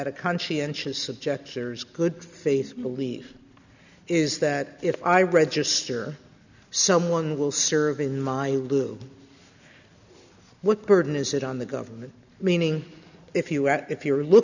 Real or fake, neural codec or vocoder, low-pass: real; none; 7.2 kHz